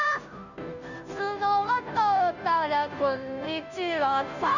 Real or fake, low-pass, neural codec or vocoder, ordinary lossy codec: fake; 7.2 kHz; codec, 16 kHz, 0.5 kbps, FunCodec, trained on Chinese and English, 25 frames a second; none